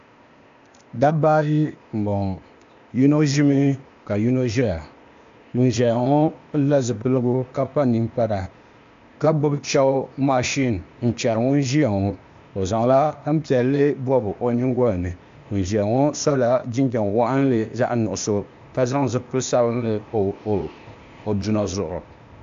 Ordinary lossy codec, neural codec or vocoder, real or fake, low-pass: AAC, 64 kbps; codec, 16 kHz, 0.8 kbps, ZipCodec; fake; 7.2 kHz